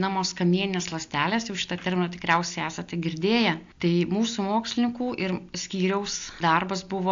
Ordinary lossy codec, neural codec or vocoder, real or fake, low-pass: AAC, 64 kbps; none; real; 7.2 kHz